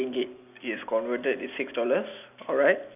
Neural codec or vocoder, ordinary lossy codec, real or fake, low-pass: none; none; real; 3.6 kHz